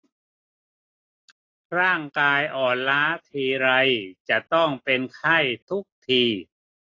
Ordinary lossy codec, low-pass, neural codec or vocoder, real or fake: AAC, 32 kbps; 7.2 kHz; none; real